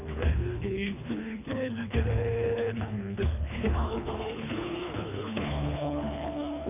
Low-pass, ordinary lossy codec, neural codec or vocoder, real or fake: 3.6 kHz; none; codec, 24 kHz, 3 kbps, HILCodec; fake